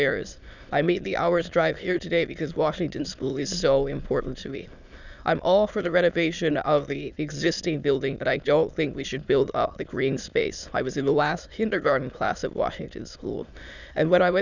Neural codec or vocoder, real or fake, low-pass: autoencoder, 22.05 kHz, a latent of 192 numbers a frame, VITS, trained on many speakers; fake; 7.2 kHz